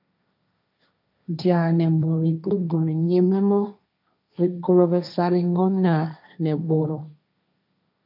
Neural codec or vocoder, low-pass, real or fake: codec, 16 kHz, 1.1 kbps, Voila-Tokenizer; 5.4 kHz; fake